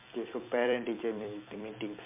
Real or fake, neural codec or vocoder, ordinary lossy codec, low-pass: fake; vocoder, 44.1 kHz, 128 mel bands every 256 samples, BigVGAN v2; MP3, 32 kbps; 3.6 kHz